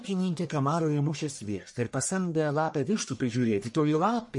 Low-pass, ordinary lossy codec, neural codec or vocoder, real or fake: 10.8 kHz; MP3, 48 kbps; codec, 44.1 kHz, 1.7 kbps, Pupu-Codec; fake